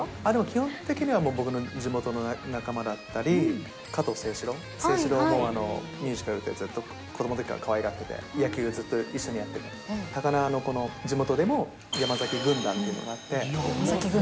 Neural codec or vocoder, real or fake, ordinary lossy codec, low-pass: none; real; none; none